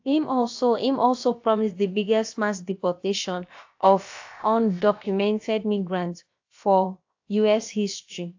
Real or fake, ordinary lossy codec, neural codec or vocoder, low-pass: fake; AAC, 48 kbps; codec, 16 kHz, about 1 kbps, DyCAST, with the encoder's durations; 7.2 kHz